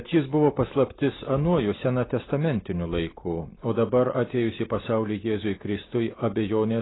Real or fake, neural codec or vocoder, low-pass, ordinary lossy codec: real; none; 7.2 kHz; AAC, 16 kbps